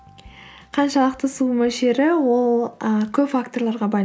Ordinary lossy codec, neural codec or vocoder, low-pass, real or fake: none; none; none; real